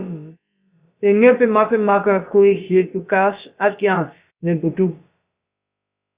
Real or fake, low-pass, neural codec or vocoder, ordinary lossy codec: fake; 3.6 kHz; codec, 16 kHz, about 1 kbps, DyCAST, with the encoder's durations; Opus, 64 kbps